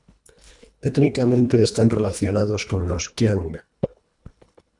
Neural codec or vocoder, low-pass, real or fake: codec, 24 kHz, 1.5 kbps, HILCodec; 10.8 kHz; fake